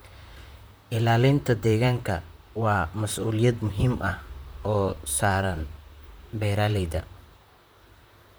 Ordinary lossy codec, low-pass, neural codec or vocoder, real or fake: none; none; vocoder, 44.1 kHz, 128 mel bands, Pupu-Vocoder; fake